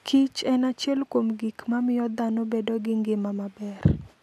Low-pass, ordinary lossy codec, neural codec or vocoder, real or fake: 14.4 kHz; none; none; real